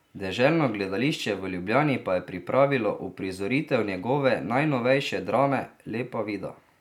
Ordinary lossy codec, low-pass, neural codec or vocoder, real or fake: none; 19.8 kHz; none; real